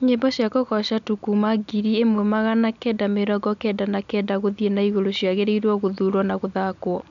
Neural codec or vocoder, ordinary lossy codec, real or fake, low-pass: none; none; real; 7.2 kHz